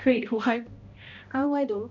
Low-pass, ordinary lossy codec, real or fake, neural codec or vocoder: 7.2 kHz; none; fake; codec, 16 kHz, 0.5 kbps, X-Codec, HuBERT features, trained on balanced general audio